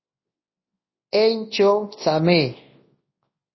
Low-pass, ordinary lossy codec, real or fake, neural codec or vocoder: 7.2 kHz; MP3, 24 kbps; fake; codec, 24 kHz, 0.9 kbps, WavTokenizer, large speech release